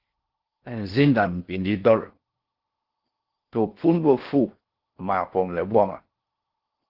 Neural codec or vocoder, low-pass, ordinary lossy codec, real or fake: codec, 16 kHz in and 24 kHz out, 0.6 kbps, FocalCodec, streaming, 4096 codes; 5.4 kHz; Opus, 16 kbps; fake